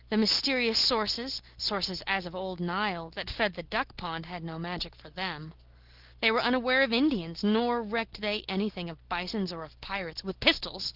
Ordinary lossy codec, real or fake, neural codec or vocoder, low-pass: Opus, 16 kbps; real; none; 5.4 kHz